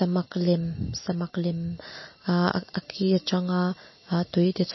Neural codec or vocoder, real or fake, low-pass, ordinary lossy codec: none; real; 7.2 kHz; MP3, 24 kbps